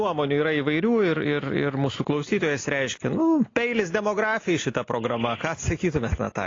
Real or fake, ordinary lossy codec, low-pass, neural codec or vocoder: real; AAC, 32 kbps; 7.2 kHz; none